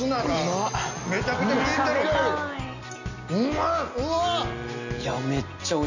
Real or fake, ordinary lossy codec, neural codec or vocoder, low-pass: real; none; none; 7.2 kHz